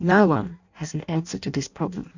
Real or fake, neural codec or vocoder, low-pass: fake; codec, 16 kHz in and 24 kHz out, 0.6 kbps, FireRedTTS-2 codec; 7.2 kHz